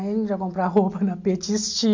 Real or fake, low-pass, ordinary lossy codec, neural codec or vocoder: real; 7.2 kHz; MP3, 64 kbps; none